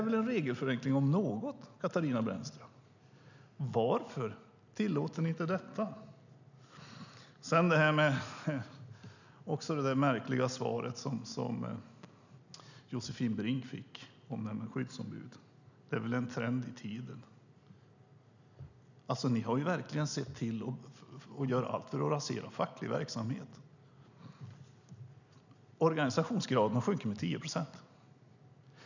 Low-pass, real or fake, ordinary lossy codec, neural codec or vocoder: 7.2 kHz; real; none; none